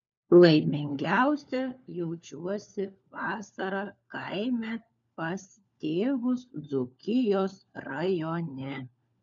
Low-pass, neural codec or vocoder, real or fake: 7.2 kHz; codec, 16 kHz, 4 kbps, FunCodec, trained on LibriTTS, 50 frames a second; fake